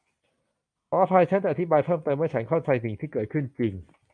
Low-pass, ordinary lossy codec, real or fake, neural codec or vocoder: 9.9 kHz; MP3, 96 kbps; fake; vocoder, 44.1 kHz, 128 mel bands every 256 samples, BigVGAN v2